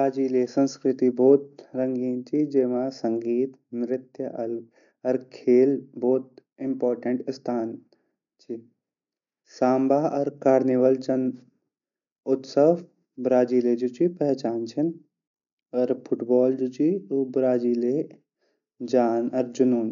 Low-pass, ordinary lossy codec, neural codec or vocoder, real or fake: 7.2 kHz; none; none; real